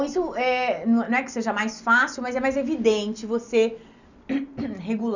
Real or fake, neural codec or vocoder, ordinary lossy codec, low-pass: real; none; none; 7.2 kHz